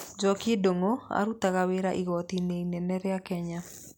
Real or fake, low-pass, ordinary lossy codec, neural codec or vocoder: real; none; none; none